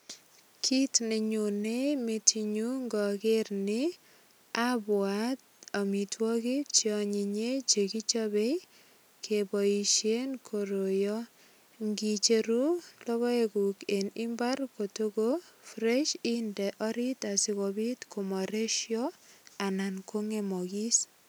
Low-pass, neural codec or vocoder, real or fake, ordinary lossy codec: none; none; real; none